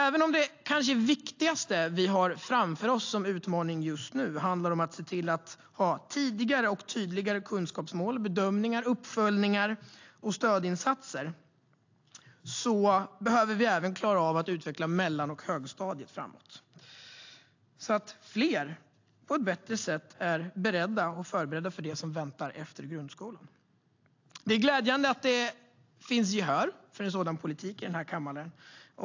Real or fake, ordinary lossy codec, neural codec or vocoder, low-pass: real; AAC, 48 kbps; none; 7.2 kHz